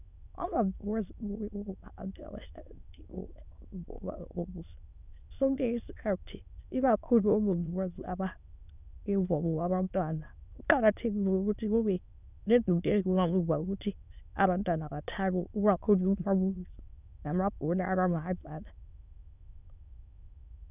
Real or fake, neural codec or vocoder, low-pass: fake; autoencoder, 22.05 kHz, a latent of 192 numbers a frame, VITS, trained on many speakers; 3.6 kHz